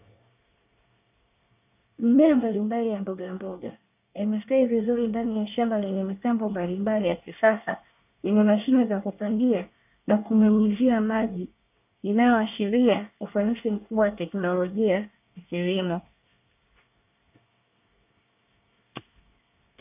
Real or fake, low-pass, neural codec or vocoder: fake; 3.6 kHz; codec, 24 kHz, 1 kbps, SNAC